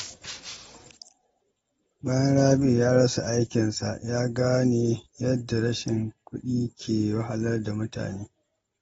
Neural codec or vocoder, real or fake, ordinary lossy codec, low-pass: none; real; AAC, 24 kbps; 10.8 kHz